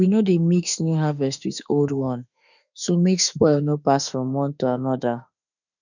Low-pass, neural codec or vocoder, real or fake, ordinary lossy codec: 7.2 kHz; autoencoder, 48 kHz, 32 numbers a frame, DAC-VAE, trained on Japanese speech; fake; none